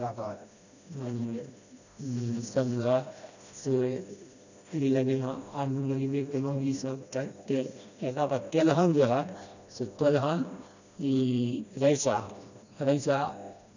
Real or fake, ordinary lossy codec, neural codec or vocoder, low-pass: fake; none; codec, 16 kHz, 1 kbps, FreqCodec, smaller model; 7.2 kHz